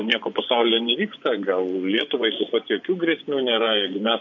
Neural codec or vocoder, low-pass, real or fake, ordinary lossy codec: vocoder, 24 kHz, 100 mel bands, Vocos; 7.2 kHz; fake; MP3, 48 kbps